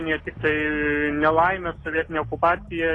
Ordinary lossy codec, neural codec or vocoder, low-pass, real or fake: AAC, 32 kbps; none; 10.8 kHz; real